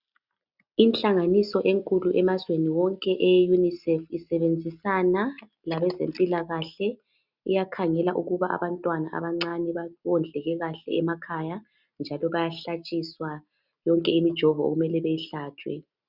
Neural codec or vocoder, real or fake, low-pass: none; real; 5.4 kHz